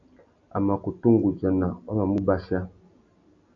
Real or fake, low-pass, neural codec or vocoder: real; 7.2 kHz; none